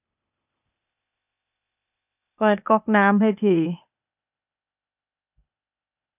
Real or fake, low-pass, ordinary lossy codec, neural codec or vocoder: fake; 3.6 kHz; none; codec, 16 kHz, 0.8 kbps, ZipCodec